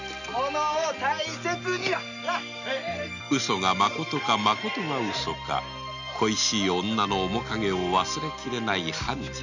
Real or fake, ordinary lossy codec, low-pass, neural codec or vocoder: real; none; 7.2 kHz; none